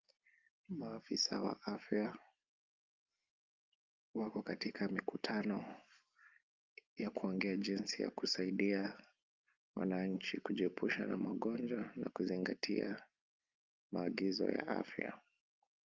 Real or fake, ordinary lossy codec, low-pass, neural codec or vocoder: real; Opus, 24 kbps; 7.2 kHz; none